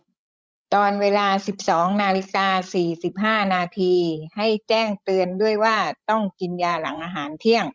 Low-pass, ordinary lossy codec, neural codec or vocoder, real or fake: none; none; codec, 16 kHz, 16 kbps, FreqCodec, larger model; fake